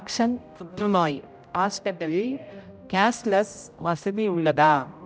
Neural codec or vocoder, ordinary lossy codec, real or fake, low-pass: codec, 16 kHz, 0.5 kbps, X-Codec, HuBERT features, trained on general audio; none; fake; none